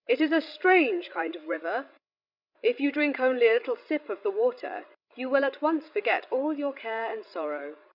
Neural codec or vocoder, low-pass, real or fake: codec, 16 kHz, 16 kbps, FreqCodec, larger model; 5.4 kHz; fake